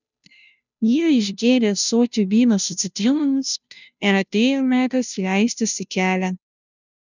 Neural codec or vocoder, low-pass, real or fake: codec, 16 kHz, 0.5 kbps, FunCodec, trained on Chinese and English, 25 frames a second; 7.2 kHz; fake